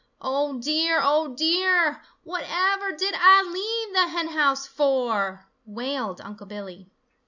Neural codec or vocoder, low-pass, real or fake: none; 7.2 kHz; real